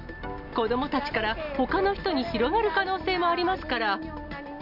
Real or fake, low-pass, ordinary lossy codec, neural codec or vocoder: real; 5.4 kHz; none; none